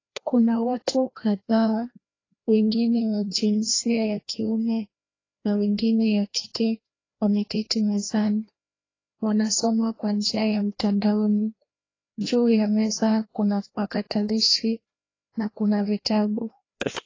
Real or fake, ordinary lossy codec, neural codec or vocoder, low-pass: fake; AAC, 32 kbps; codec, 16 kHz, 1 kbps, FreqCodec, larger model; 7.2 kHz